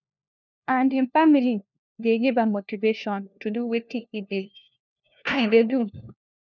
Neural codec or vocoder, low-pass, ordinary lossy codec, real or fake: codec, 16 kHz, 1 kbps, FunCodec, trained on LibriTTS, 50 frames a second; 7.2 kHz; none; fake